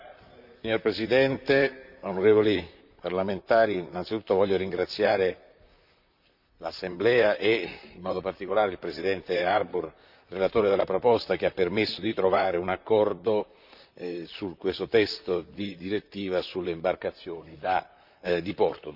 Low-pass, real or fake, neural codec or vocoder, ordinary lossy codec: 5.4 kHz; fake; vocoder, 44.1 kHz, 128 mel bands, Pupu-Vocoder; none